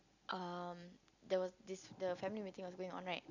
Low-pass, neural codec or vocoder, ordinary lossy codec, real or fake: 7.2 kHz; none; none; real